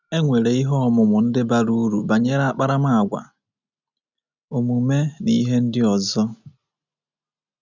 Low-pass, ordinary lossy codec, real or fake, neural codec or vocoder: 7.2 kHz; none; real; none